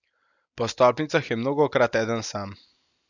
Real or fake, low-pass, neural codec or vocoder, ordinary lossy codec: real; 7.2 kHz; none; none